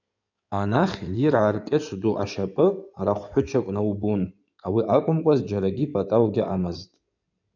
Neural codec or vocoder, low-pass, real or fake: codec, 16 kHz in and 24 kHz out, 2.2 kbps, FireRedTTS-2 codec; 7.2 kHz; fake